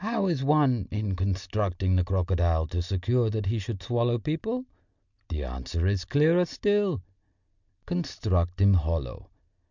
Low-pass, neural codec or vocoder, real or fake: 7.2 kHz; none; real